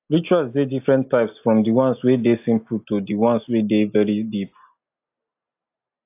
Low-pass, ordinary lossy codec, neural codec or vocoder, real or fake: 3.6 kHz; AAC, 32 kbps; none; real